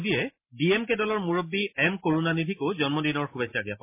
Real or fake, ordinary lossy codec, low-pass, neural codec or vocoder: real; MP3, 24 kbps; 3.6 kHz; none